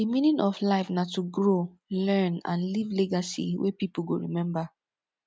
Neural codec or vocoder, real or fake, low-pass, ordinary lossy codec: none; real; none; none